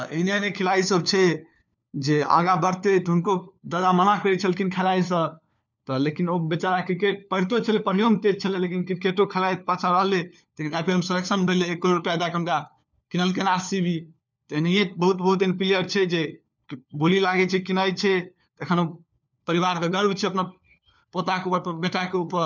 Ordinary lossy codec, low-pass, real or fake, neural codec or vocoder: none; 7.2 kHz; fake; codec, 16 kHz, 4 kbps, FunCodec, trained on LibriTTS, 50 frames a second